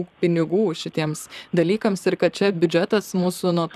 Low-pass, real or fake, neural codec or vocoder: 14.4 kHz; fake; vocoder, 44.1 kHz, 128 mel bands, Pupu-Vocoder